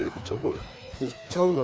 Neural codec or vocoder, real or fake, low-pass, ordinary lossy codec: codec, 16 kHz, 2 kbps, FreqCodec, larger model; fake; none; none